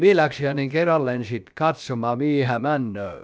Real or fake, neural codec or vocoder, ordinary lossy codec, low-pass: fake; codec, 16 kHz, about 1 kbps, DyCAST, with the encoder's durations; none; none